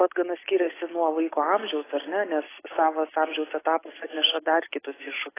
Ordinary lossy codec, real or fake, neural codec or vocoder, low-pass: AAC, 16 kbps; real; none; 3.6 kHz